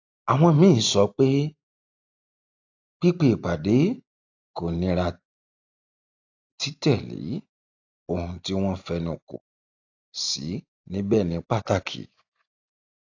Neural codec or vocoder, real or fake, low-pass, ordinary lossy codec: none; real; 7.2 kHz; AAC, 48 kbps